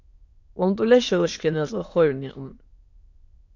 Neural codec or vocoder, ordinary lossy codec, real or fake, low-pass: autoencoder, 22.05 kHz, a latent of 192 numbers a frame, VITS, trained on many speakers; AAC, 48 kbps; fake; 7.2 kHz